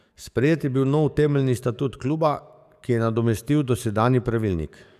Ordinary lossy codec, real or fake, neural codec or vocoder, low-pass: none; fake; codec, 44.1 kHz, 7.8 kbps, DAC; 14.4 kHz